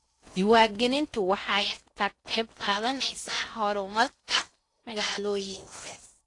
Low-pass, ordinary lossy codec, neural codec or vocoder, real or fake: 10.8 kHz; AAC, 48 kbps; codec, 16 kHz in and 24 kHz out, 0.6 kbps, FocalCodec, streaming, 4096 codes; fake